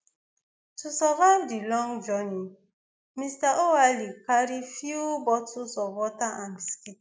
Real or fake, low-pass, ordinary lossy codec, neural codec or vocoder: real; none; none; none